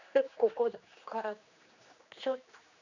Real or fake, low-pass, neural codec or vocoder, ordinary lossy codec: fake; 7.2 kHz; codec, 16 kHz, 2 kbps, X-Codec, HuBERT features, trained on general audio; none